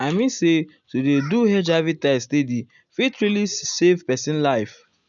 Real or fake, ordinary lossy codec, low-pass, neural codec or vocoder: real; none; 7.2 kHz; none